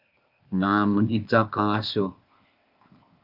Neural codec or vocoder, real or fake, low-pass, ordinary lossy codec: codec, 16 kHz, 0.8 kbps, ZipCodec; fake; 5.4 kHz; Opus, 24 kbps